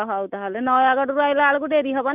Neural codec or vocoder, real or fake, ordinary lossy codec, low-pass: none; real; none; 3.6 kHz